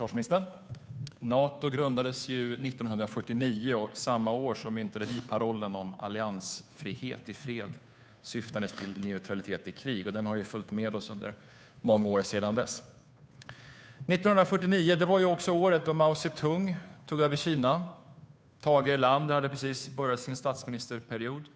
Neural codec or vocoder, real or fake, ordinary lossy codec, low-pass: codec, 16 kHz, 2 kbps, FunCodec, trained on Chinese and English, 25 frames a second; fake; none; none